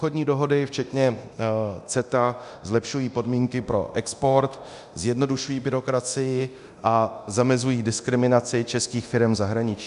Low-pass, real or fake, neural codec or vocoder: 10.8 kHz; fake; codec, 24 kHz, 0.9 kbps, DualCodec